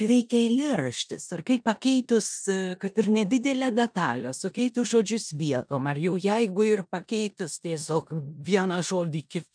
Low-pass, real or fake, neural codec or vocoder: 9.9 kHz; fake; codec, 16 kHz in and 24 kHz out, 0.9 kbps, LongCat-Audio-Codec, four codebook decoder